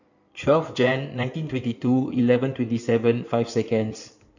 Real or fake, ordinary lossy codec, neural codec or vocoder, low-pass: fake; none; codec, 16 kHz in and 24 kHz out, 2.2 kbps, FireRedTTS-2 codec; 7.2 kHz